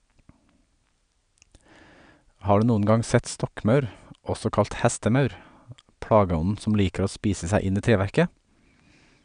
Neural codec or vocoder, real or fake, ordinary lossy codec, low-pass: none; real; none; 9.9 kHz